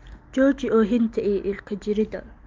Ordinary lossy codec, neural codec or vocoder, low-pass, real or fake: Opus, 32 kbps; none; 7.2 kHz; real